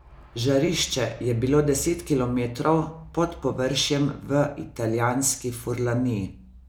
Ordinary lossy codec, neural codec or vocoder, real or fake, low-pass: none; none; real; none